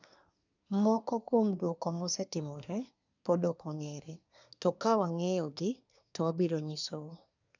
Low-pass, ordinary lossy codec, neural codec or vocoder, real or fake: 7.2 kHz; none; codec, 24 kHz, 1 kbps, SNAC; fake